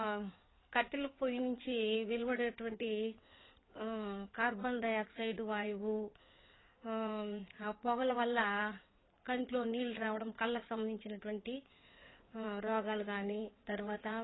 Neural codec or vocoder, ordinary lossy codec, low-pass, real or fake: codec, 16 kHz in and 24 kHz out, 2.2 kbps, FireRedTTS-2 codec; AAC, 16 kbps; 7.2 kHz; fake